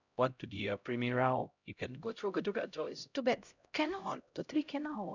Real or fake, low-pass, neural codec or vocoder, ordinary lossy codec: fake; 7.2 kHz; codec, 16 kHz, 0.5 kbps, X-Codec, HuBERT features, trained on LibriSpeech; none